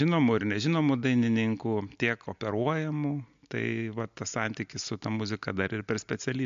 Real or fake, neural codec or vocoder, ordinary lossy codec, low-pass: real; none; MP3, 64 kbps; 7.2 kHz